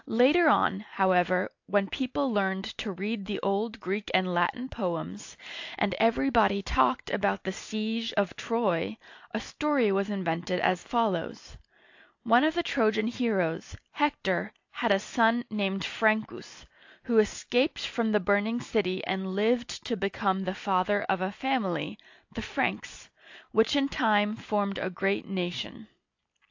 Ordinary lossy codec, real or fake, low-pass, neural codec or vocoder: AAC, 48 kbps; real; 7.2 kHz; none